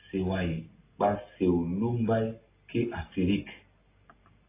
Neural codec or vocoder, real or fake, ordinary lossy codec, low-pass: none; real; AAC, 24 kbps; 3.6 kHz